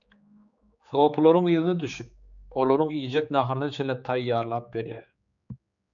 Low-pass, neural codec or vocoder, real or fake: 7.2 kHz; codec, 16 kHz, 4 kbps, X-Codec, HuBERT features, trained on general audio; fake